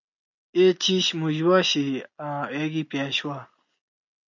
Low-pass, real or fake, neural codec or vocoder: 7.2 kHz; real; none